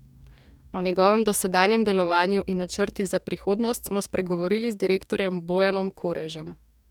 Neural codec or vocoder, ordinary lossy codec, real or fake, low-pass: codec, 44.1 kHz, 2.6 kbps, DAC; none; fake; 19.8 kHz